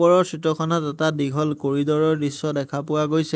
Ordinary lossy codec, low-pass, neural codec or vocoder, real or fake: none; none; none; real